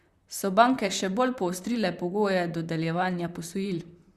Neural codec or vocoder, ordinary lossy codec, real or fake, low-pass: vocoder, 44.1 kHz, 128 mel bands, Pupu-Vocoder; Opus, 64 kbps; fake; 14.4 kHz